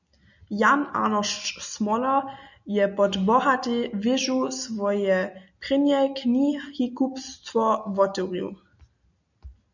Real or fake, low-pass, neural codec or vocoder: real; 7.2 kHz; none